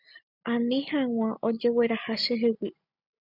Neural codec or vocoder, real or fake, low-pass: none; real; 5.4 kHz